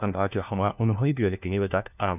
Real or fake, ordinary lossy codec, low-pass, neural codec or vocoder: fake; none; 3.6 kHz; codec, 16 kHz, 1 kbps, FunCodec, trained on LibriTTS, 50 frames a second